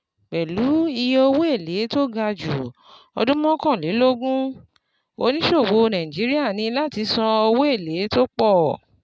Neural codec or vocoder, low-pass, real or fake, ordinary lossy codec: none; none; real; none